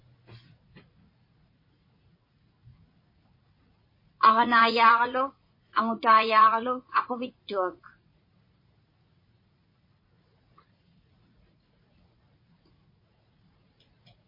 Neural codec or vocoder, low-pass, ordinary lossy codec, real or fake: vocoder, 44.1 kHz, 80 mel bands, Vocos; 5.4 kHz; MP3, 24 kbps; fake